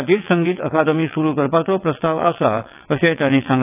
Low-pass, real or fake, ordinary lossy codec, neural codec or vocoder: 3.6 kHz; fake; AAC, 32 kbps; vocoder, 22.05 kHz, 80 mel bands, WaveNeXt